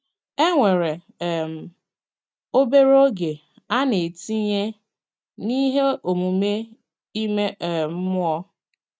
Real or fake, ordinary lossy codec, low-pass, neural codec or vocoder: real; none; none; none